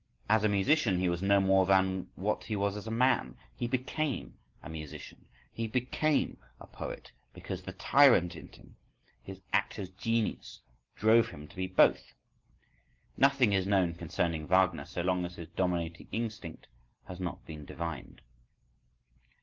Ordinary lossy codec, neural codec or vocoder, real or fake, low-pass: Opus, 16 kbps; none; real; 7.2 kHz